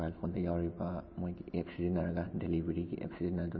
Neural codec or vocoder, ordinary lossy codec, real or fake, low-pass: none; MP3, 24 kbps; real; 7.2 kHz